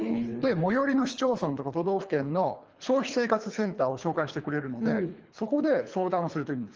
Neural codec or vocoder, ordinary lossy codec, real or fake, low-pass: codec, 24 kHz, 3 kbps, HILCodec; Opus, 24 kbps; fake; 7.2 kHz